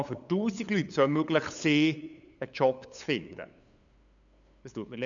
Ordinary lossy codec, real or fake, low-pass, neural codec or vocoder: none; fake; 7.2 kHz; codec, 16 kHz, 8 kbps, FunCodec, trained on LibriTTS, 25 frames a second